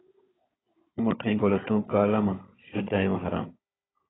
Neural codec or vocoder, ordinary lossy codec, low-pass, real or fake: codec, 16 kHz, 16 kbps, FunCodec, trained on Chinese and English, 50 frames a second; AAC, 16 kbps; 7.2 kHz; fake